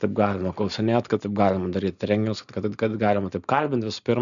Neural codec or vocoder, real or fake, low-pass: none; real; 7.2 kHz